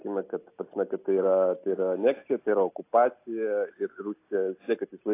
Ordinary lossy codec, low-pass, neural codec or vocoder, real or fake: AAC, 24 kbps; 3.6 kHz; none; real